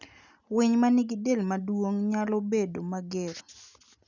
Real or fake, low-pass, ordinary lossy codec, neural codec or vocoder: real; 7.2 kHz; none; none